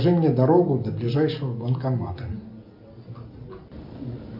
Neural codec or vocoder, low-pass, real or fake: none; 5.4 kHz; real